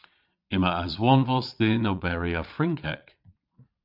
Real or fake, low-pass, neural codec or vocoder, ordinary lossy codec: fake; 5.4 kHz; vocoder, 22.05 kHz, 80 mel bands, Vocos; MP3, 48 kbps